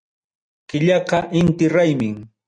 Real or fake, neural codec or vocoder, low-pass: real; none; 9.9 kHz